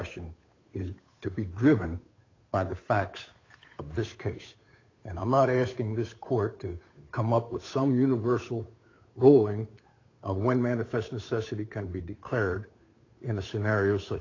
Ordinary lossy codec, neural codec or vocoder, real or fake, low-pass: AAC, 32 kbps; codec, 16 kHz, 8 kbps, FunCodec, trained on Chinese and English, 25 frames a second; fake; 7.2 kHz